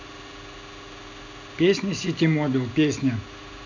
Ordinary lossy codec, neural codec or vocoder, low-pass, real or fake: none; none; 7.2 kHz; real